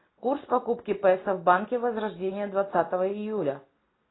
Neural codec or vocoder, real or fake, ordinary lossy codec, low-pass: none; real; AAC, 16 kbps; 7.2 kHz